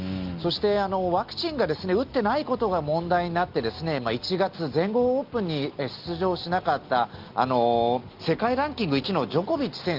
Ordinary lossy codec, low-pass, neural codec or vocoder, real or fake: Opus, 32 kbps; 5.4 kHz; none; real